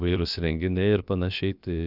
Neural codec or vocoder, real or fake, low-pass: codec, 16 kHz, about 1 kbps, DyCAST, with the encoder's durations; fake; 5.4 kHz